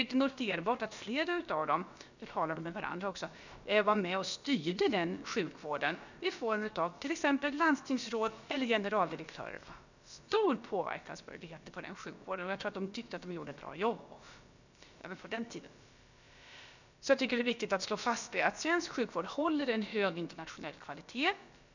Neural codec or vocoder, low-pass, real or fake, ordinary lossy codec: codec, 16 kHz, about 1 kbps, DyCAST, with the encoder's durations; 7.2 kHz; fake; none